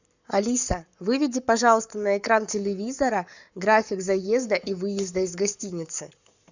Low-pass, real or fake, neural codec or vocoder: 7.2 kHz; fake; codec, 44.1 kHz, 7.8 kbps, Pupu-Codec